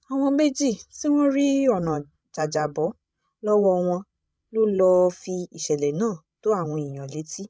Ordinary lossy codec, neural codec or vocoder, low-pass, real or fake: none; codec, 16 kHz, 16 kbps, FreqCodec, larger model; none; fake